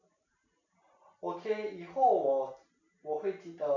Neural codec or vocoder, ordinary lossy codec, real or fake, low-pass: none; none; real; 7.2 kHz